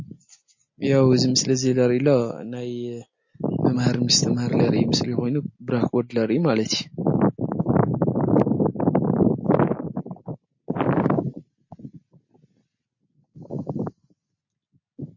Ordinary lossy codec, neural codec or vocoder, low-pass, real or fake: MP3, 32 kbps; none; 7.2 kHz; real